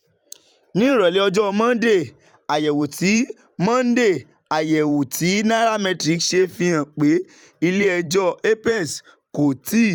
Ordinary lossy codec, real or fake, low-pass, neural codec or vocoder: none; fake; 19.8 kHz; vocoder, 44.1 kHz, 128 mel bands every 512 samples, BigVGAN v2